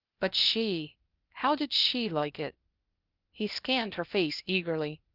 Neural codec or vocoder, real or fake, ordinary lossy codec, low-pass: codec, 16 kHz, 0.8 kbps, ZipCodec; fake; Opus, 24 kbps; 5.4 kHz